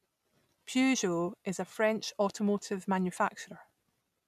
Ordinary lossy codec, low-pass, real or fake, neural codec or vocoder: none; 14.4 kHz; real; none